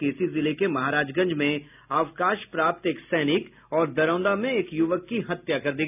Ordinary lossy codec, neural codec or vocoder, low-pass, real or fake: none; none; 3.6 kHz; real